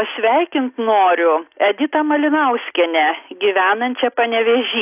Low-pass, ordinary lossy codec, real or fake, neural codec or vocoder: 3.6 kHz; AAC, 32 kbps; real; none